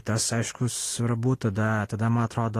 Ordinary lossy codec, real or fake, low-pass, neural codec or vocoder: AAC, 48 kbps; real; 14.4 kHz; none